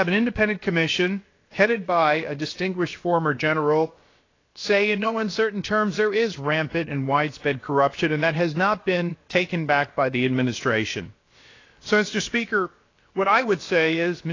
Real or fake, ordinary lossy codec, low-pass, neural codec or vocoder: fake; AAC, 32 kbps; 7.2 kHz; codec, 16 kHz, about 1 kbps, DyCAST, with the encoder's durations